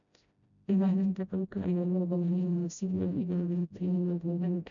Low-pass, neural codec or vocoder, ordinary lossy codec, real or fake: 7.2 kHz; codec, 16 kHz, 0.5 kbps, FreqCodec, smaller model; none; fake